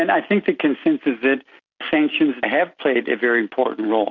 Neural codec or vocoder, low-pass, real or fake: none; 7.2 kHz; real